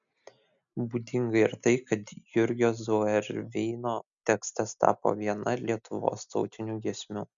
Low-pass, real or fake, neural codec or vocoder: 7.2 kHz; real; none